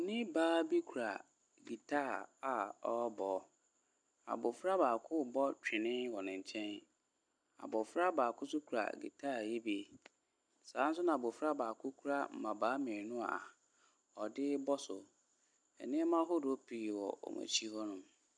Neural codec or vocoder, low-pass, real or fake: none; 9.9 kHz; real